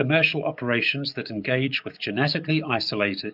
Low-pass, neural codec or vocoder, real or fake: 5.4 kHz; codec, 44.1 kHz, 7.8 kbps, DAC; fake